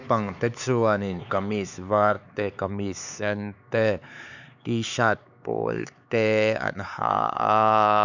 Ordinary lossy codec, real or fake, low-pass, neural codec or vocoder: none; fake; 7.2 kHz; codec, 16 kHz, 4 kbps, X-Codec, HuBERT features, trained on LibriSpeech